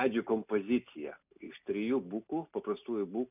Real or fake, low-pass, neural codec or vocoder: real; 3.6 kHz; none